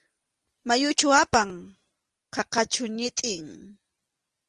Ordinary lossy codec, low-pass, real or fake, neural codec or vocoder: Opus, 24 kbps; 10.8 kHz; real; none